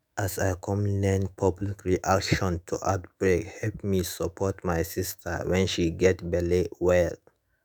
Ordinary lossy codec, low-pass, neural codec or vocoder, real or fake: none; none; autoencoder, 48 kHz, 128 numbers a frame, DAC-VAE, trained on Japanese speech; fake